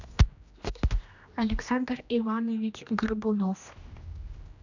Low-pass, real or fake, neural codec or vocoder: 7.2 kHz; fake; codec, 16 kHz, 1 kbps, X-Codec, HuBERT features, trained on general audio